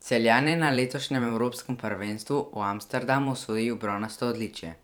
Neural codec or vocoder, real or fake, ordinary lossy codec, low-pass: vocoder, 44.1 kHz, 128 mel bands every 512 samples, BigVGAN v2; fake; none; none